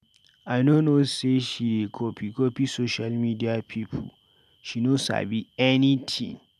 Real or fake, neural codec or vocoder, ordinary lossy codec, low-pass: real; none; none; 14.4 kHz